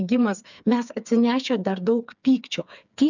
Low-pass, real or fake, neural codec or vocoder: 7.2 kHz; fake; codec, 16 kHz, 4 kbps, FreqCodec, smaller model